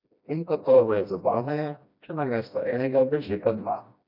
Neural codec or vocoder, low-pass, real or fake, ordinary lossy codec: codec, 16 kHz, 1 kbps, FreqCodec, smaller model; 5.4 kHz; fake; none